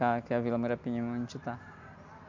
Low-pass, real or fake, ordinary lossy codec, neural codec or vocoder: 7.2 kHz; real; MP3, 64 kbps; none